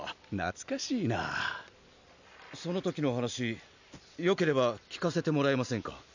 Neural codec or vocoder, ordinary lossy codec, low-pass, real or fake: none; none; 7.2 kHz; real